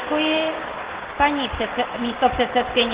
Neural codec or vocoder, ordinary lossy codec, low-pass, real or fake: none; Opus, 16 kbps; 3.6 kHz; real